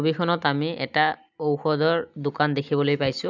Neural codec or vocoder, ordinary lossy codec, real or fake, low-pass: none; none; real; 7.2 kHz